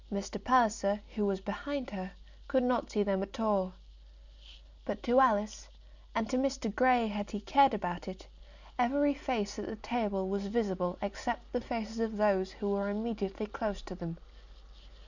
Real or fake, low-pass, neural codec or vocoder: real; 7.2 kHz; none